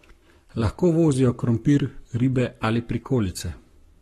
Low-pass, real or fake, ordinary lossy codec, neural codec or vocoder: 19.8 kHz; fake; AAC, 32 kbps; codec, 44.1 kHz, 7.8 kbps, Pupu-Codec